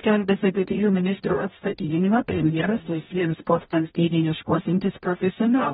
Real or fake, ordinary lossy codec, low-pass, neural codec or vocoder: fake; AAC, 16 kbps; 19.8 kHz; codec, 44.1 kHz, 0.9 kbps, DAC